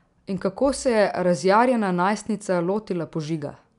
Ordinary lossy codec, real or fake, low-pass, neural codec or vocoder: none; real; 10.8 kHz; none